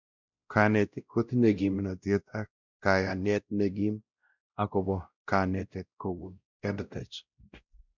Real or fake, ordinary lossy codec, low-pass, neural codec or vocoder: fake; none; 7.2 kHz; codec, 16 kHz, 0.5 kbps, X-Codec, WavLM features, trained on Multilingual LibriSpeech